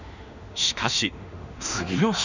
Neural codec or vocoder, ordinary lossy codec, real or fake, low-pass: autoencoder, 48 kHz, 32 numbers a frame, DAC-VAE, trained on Japanese speech; none; fake; 7.2 kHz